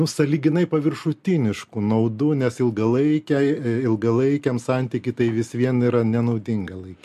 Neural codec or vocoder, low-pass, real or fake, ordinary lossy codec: none; 14.4 kHz; real; MP3, 64 kbps